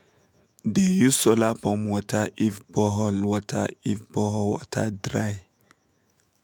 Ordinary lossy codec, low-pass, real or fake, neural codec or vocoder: MP3, 96 kbps; 19.8 kHz; fake; autoencoder, 48 kHz, 128 numbers a frame, DAC-VAE, trained on Japanese speech